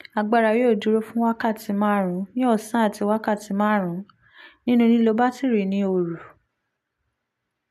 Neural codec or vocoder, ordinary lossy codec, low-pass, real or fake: none; MP3, 96 kbps; 14.4 kHz; real